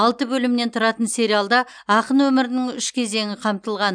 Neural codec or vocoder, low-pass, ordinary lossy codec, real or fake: none; 9.9 kHz; none; real